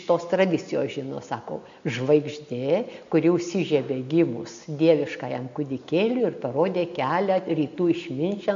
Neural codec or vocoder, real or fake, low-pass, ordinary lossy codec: none; real; 7.2 kHz; AAC, 64 kbps